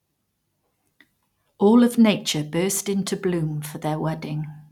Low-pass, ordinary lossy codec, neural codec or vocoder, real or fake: 19.8 kHz; none; none; real